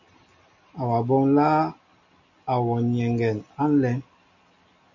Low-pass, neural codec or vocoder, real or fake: 7.2 kHz; none; real